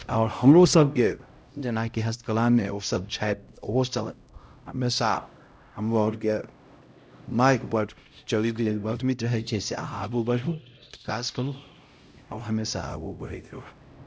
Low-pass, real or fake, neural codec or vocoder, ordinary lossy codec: none; fake; codec, 16 kHz, 0.5 kbps, X-Codec, HuBERT features, trained on LibriSpeech; none